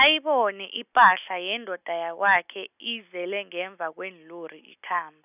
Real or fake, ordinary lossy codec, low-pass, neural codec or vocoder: real; none; 3.6 kHz; none